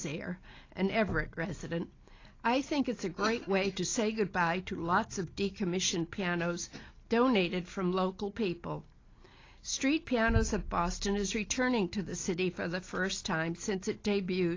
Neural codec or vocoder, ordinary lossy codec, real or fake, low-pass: none; AAC, 32 kbps; real; 7.2 kHz